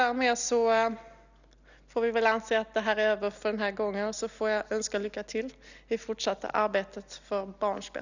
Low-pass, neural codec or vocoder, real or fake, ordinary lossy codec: 7.2 kHz; none; real; none